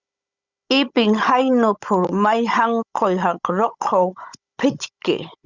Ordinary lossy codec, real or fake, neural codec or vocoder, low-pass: Opus, 64 kbps; fake; codec, 16 kHz, 16 kbps, FunCodec, trained on Chinese and English, 50 frames a second; 7.2 kHz